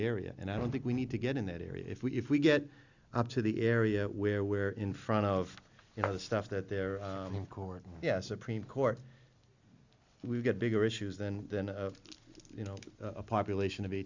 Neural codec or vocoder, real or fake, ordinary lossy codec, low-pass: none; real; Opus, 64 kbps; 7.2 kHz